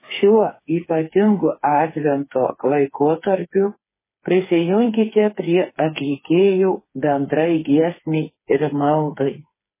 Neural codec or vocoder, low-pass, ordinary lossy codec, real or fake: codec, 16 kHz, 4 kbps, FreqCodec, smaller model; 3.6 kHz; MP3, 16 kbps; fake